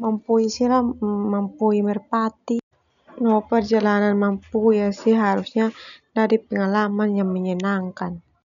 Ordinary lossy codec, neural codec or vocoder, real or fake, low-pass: none; none; real; 7.2 kHz